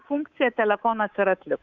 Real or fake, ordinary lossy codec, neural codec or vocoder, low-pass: fake; Opus, 64 kbps; codec, 24 kHz, 3.1 kbps, DualCodec; 7.2 kHz